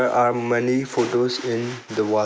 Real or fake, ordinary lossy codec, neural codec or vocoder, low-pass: real; none; none; none